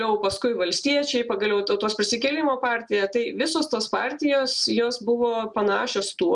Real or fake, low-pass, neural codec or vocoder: real; 10.8 kHz; none